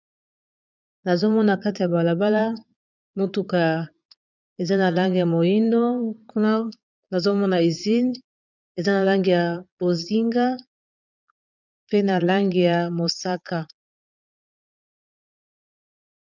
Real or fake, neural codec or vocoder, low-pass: fake; vocoder, 22.05 kHz, 80 mel bands, Vocos; 7.2 kHz